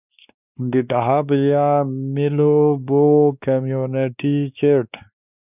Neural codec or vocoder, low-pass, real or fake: codec, 16 kHz, 2 kbps, X-Codec, WavLM features, trained on Multilingual LibriSpeech; 3.6 kHz; fake